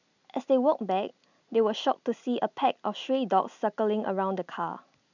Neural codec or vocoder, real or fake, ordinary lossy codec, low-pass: none; real; none; 7.2 kHz